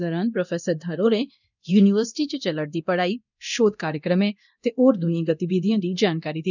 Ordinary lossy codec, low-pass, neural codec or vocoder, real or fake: none; 7.2 kHz; codec, 24 kHz, 0.9 kbps, DualCodec; fake